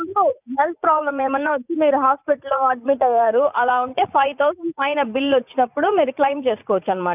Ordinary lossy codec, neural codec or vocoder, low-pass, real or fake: none; vocoder, 44.1 kHz, 80 mel bands, Vocos; 3.6 kHz; fake